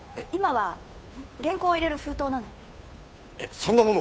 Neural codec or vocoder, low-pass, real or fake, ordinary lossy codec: codec, 16 kHz, 2 kbps, FunCodec, trained on Chinese and English, 25 frames a second; none; fake; none